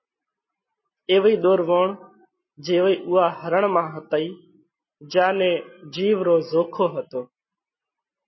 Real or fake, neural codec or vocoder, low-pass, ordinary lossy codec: real; none; 7.2 kHz; MP3, 24 kbps